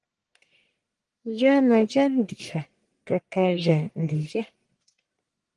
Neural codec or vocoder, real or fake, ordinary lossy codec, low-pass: codec, 44.1 kHz, 1.7 kbps, Pupu-Codec; fake; Opus, 24 kbps; 10.8 kHz